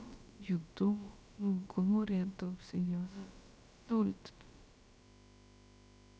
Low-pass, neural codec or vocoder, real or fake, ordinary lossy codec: none; codec, 16 kHz, about 1 kbps, DyCAST, with the encoder's durations; fake; none